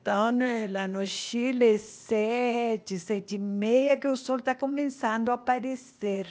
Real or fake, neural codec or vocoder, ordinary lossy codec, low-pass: fake; codec, 16 kHz, 0.8 kbps, ZipCodec; none; none